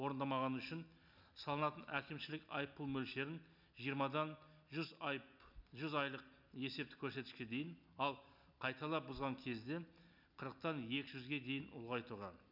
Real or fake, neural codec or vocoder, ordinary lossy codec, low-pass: real; none; none; 5.4 kHz